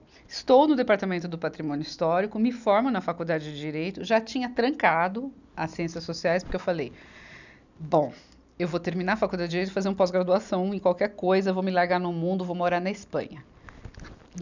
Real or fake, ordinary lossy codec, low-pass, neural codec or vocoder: real; none; 7.2 kHz; none